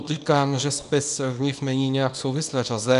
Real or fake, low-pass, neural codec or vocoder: fake; 10.8 kHz; codec, 24 kHz, 0.9 kbps, WavTokenizer, small release